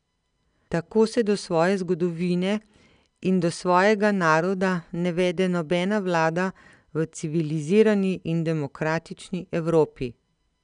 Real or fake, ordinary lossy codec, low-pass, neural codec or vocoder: real; none; 9.9 kHz; none